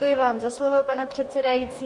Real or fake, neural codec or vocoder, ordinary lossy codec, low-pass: fake; codec, 44.1 kHz, 2.6 kbps, DAC; MP3, 48 kbps; 10.8 kHz